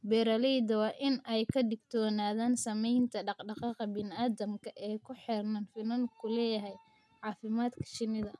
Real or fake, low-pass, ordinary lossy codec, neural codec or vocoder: real; none; none; none